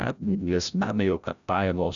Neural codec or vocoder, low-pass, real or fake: codec, 16 kHz, 0.5 kbps, FreqCodec, larger model; 7.2 kHz; fake